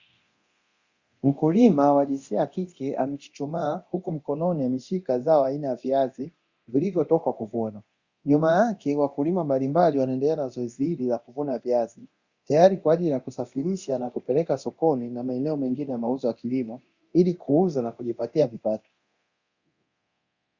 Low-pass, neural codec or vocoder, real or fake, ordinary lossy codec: 7.2 kHz; codec, 24 kHz, 0.9 kbps, DualCodec; fake; Opus, 64 kbps